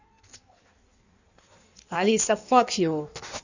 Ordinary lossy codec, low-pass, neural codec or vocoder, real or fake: none; 7.2 kHz; codec, 16 kHz in and 24 kHz out, 1.1 kbps, FireRedTTS-2 codec; fake